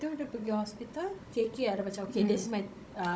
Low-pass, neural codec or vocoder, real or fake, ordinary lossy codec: none; codec, 16 kHz, 16 kbps, FunCodec, trained on Chinese and English, 50 frames a second; fake; none